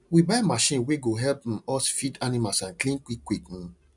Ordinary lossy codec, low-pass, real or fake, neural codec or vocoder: none; 10.8 kHz; real; none